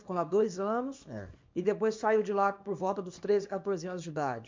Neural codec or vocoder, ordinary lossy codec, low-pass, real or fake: codec, 24 kHz, 0.9 kbps, WavTokenizer, small release; MP3, 64 kbps; 7.2 kHz; fake